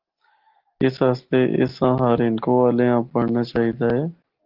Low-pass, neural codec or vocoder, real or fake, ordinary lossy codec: 5.4 kHz; none; real; Opus, 16 kbps